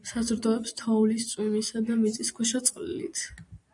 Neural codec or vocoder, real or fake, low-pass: vocoder, 44.1 kHz, 128 mel bands every 256 samples, BigVGAN v2; fake; 10.8 kHz